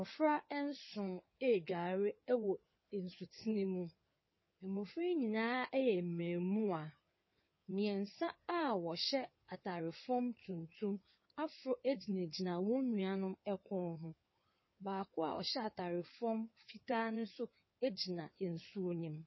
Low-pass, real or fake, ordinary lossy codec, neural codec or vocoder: 7.2 kHz; fake; MP3, 24 kbps; codec, 16 kHz in and 24 kHz out, 2.2 kbps, FireRedTTS-2 codec